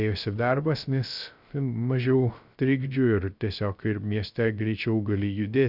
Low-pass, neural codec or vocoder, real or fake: 5.4 kHz; codec, 16 kHz, 0.3 kbps, FocalCodec; fake